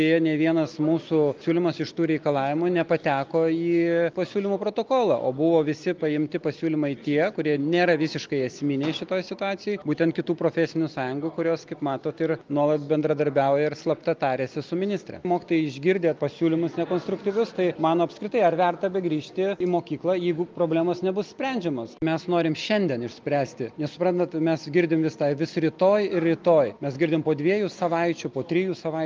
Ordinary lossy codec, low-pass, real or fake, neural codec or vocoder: Opus, 24 kbps; 7.2 kHz; real; none